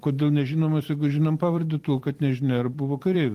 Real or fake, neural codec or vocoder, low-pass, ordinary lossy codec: fake; vocoder, 44.1 kHz, 128 mel bands every 256 samples, BigVGAN v2; 14.4 kHz; Opus, 24 kbps